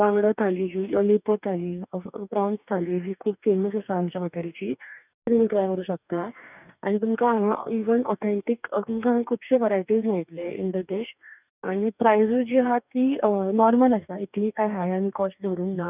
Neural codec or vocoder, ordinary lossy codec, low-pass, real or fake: codec, 44.1 kHz, 2.6 kbps, DAC; none; 3.6 kHz; fake